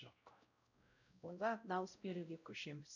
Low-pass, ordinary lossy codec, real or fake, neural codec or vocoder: 7.2 kHz; none; fake; codec, 16 kHz, 0.5 kbps, X-Codec, WavLM features, trained on Multilingual LibriSpeech